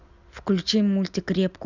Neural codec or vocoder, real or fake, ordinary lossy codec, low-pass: none; real; none; 7.2 kHz